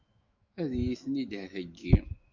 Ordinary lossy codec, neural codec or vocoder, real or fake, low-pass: MP3, 48 kbps; autoencoder, 48 kHz, 128 numbers a frame, DAC-VAE, trained on Japanese speech; fake; 7.2 kHz